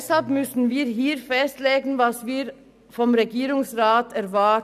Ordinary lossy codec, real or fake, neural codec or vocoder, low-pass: none; real; none; 14.4 kHz